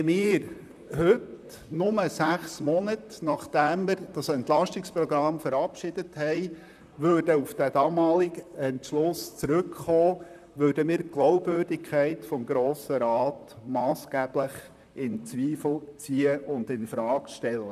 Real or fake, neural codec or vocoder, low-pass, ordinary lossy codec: fake; vocoder, 44.1 kHz, 128 mel bands, Pupu-Vocoder; 14.4 kHz; none